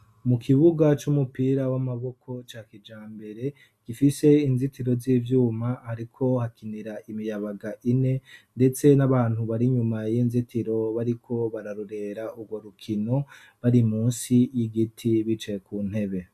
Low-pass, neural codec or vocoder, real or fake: 14.4 kHz; none; real